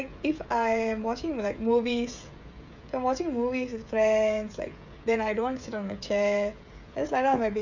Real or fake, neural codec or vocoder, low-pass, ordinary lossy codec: fake; codec, 16 kHz, 16 kbps, FreqCodec, smaller model; 7.2 kHz; none